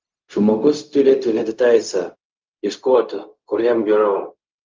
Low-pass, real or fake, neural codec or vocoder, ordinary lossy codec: 7.2 kHz; fake; codec, 16 kHz, 0.4 kbps, LongCat-Audio-Codec; Opus, 24 kbps